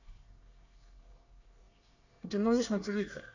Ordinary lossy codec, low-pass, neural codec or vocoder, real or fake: none; 7.2 kHz; codec, 24 kHz, 1 kbps, SNAC; fake